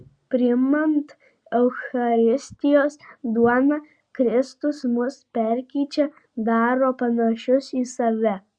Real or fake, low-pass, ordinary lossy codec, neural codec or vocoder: real; 9.9 kHz; Opus, 64 kbps; none